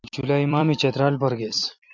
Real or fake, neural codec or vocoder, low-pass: fake; vocoder, 44.1 kHz, 128 mel bands every 256 samples, BigVGAN v2; 7.2 kHz